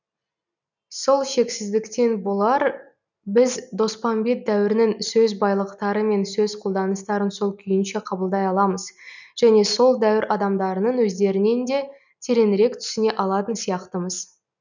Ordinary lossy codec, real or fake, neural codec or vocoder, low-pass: none; real; none; 7.2 kHz